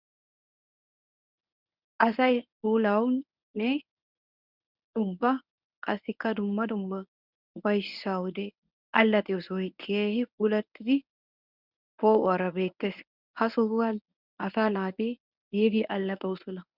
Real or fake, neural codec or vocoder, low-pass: fake; codec, 24 kHz, 0.9 kbps, WavTokenizer, medium speech release version 1; 5.4 kHz